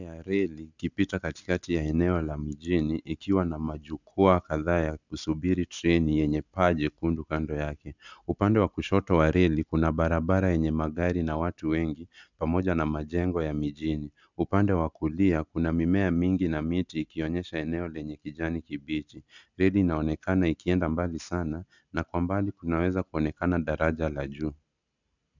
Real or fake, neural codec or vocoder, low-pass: real; none; 7.2 kHz